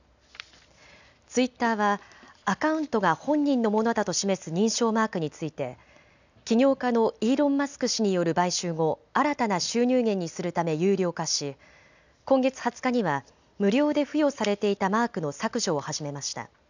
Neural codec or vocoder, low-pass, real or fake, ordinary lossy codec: none; 7.2 kHz; real; none